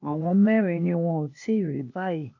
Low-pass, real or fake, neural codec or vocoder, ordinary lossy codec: 7.2 kHz; fake; codec, 16 kHz, 0.8 kbps, ZipCodec; none